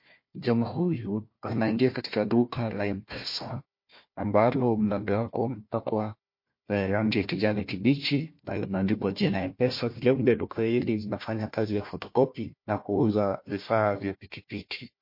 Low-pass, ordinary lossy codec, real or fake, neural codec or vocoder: 5.4 kHz; MP3, 32 kbps; fake; codec, 16 kHz, 1 kbps, FunCodec, trained on Chinese and English, 50 frames a second